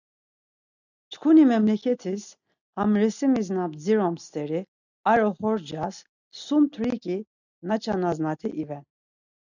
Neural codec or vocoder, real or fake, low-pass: none; real; 7.2 kHz